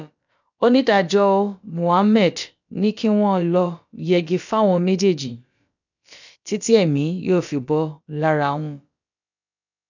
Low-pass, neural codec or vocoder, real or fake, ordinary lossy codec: 7.2 kHz; codec, 16 kHz, about 1 kbps, DyCAST, with the encoder's durations; fake; none